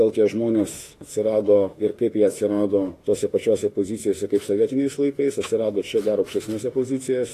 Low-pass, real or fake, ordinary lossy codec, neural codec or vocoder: 14.4 kHz; fake; AAC, 48 kbps; autoencoder, 48 kHz, 32 numbers a frame, DAC-VAE, trained on Japanese speech